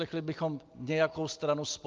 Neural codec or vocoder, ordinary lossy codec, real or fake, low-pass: none; Opus, 32 kbps; real; 7.2 kHz